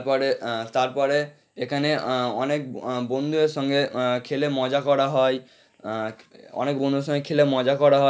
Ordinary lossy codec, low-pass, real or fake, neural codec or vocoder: none; none; real; none